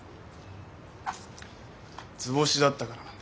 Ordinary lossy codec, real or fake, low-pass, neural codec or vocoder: none; real; none; none